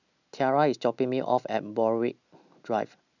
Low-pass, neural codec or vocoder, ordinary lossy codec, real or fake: 7.2 kHz; none; none; real